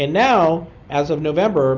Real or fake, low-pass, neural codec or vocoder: real; 7.2 kHz; none